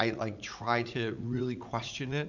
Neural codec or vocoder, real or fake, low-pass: vocoder, 44.1 kHz, 80 mel bands, Vocos; fake; 7.2 kHz